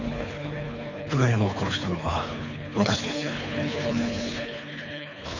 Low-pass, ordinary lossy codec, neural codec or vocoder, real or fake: 7.2 kHz; none; codec, 24 kHz, 3 kbps, HILCodec; fake